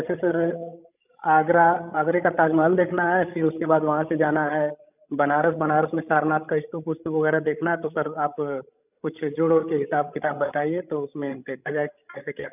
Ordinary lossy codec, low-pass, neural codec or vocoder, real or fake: none; 3.6 kHz; codec, 16 kHz, 16 kbps, FreqCodec, larger model; fake